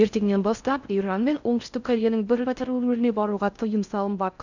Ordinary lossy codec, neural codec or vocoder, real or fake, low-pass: none; codec, 16 kHz in and 24 kHz out, 0.6 kbps, FocalCodec, streaming, 4096 codes; fake; 7.2 kHz